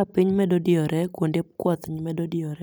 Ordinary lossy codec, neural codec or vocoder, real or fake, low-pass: none; none; real; none